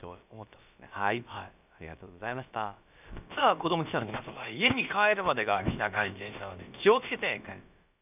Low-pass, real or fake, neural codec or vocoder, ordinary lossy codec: 3.6 kHz; fake; codec, 16 kHz, about 1 kbps, DyCAST, with the encoder's durations; none